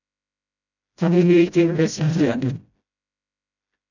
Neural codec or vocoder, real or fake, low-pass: codec, 16 kHz, 0.5 kbps, FreqCodec, smaller model; fake; 7.2 kHz